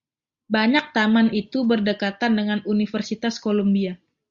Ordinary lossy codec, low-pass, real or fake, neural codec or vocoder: Opus, 64 kbps; 7.2 kHz; real; none